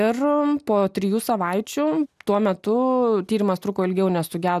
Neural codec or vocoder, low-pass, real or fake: none; 14.4 kHz; real